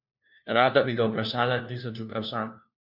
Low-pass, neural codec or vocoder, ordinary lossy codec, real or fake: 5.4 kHz; codec, 16 kHz, 1 kbps, FunCodec, trained on LibriTTS, 50 frames a second; none; fake